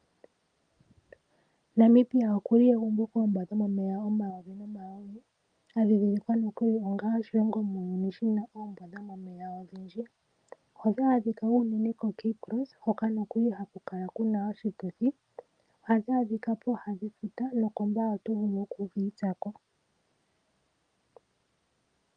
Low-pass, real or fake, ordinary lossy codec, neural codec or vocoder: 9.9 kHz; real; Opus, 32 kbps; none